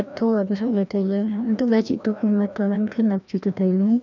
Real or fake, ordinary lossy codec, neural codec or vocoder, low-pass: fake; none; codec, 16 kHz, 1 kbps, FreqCodec, larger model; 7.2 kHz